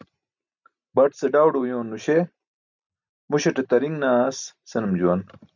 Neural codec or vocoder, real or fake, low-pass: none; real; 7.2 kHz